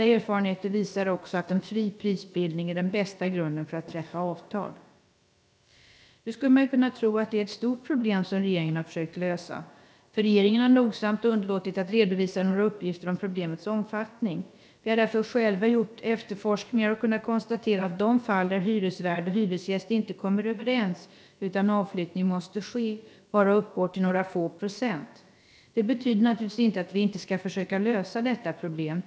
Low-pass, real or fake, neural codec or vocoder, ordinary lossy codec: none; fake; codec, 16 kHz, about 1 kbps, DyCAST, with the encoder's durations; none